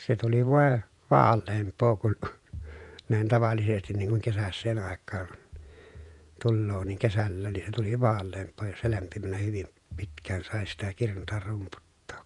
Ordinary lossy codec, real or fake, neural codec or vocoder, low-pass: none; fake; vocoder, 48 kHz, 128 mel bands, Vocos; 10.8 kHz